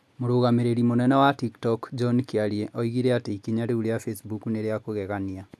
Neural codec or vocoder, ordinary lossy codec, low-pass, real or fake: none; none; none; real